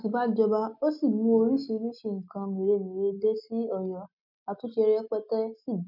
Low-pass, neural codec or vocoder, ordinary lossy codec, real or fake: 5.4 kHz; none; none; real